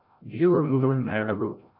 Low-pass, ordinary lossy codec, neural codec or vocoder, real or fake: 5.4 kHz; AAC, 48 kbps; codec, 16 kHz, 0.5 kbps, FreqCodec, larger model; fake